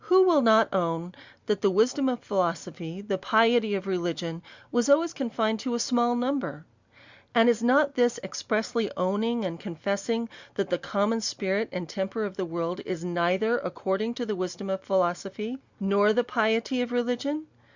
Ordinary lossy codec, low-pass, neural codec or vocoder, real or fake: Opus, 64 kbps; 7.2 kHz; none; real